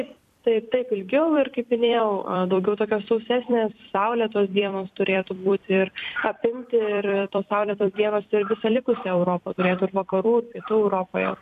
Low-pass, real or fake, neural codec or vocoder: 14.4 kHz; fake; vocoder, 44.1 kHz, 128 mel bands every 256 samples, BigVGAN v2